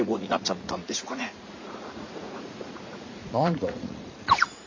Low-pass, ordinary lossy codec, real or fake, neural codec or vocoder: 7.2 kHz; MP3, 48 kbps; fake; vocoder, 44.1 kHz, 80 mel bands, Vocos